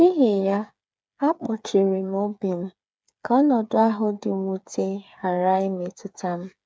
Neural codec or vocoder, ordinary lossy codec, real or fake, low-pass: codec, 16 kHz, 8 kbps, FreqCodec, smaller model; none; fake; none